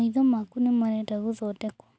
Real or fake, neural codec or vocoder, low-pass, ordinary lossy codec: real; none; none; none